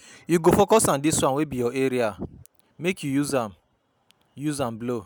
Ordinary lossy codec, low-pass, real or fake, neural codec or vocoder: none; none; real; none